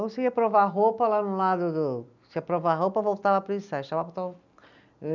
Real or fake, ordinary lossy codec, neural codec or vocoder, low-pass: real; none; none; 7.2 kHz